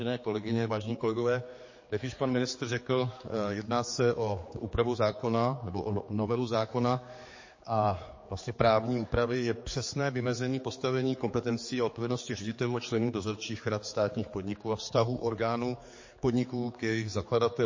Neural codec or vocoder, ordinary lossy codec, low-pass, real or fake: codec, 16 kHz, 4 kbps, X-Codec, HuBERT features, trained on general audio; MP3, 32 kbps; 7.2 kHz; fake